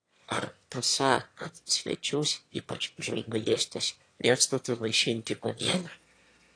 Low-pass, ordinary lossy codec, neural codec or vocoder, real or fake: 9.9 kHz; AAC, 48 kbps; autoencoder, 22.05 kHz, a latent of 192 numbers a frame, VITS, trained on one speaker; fake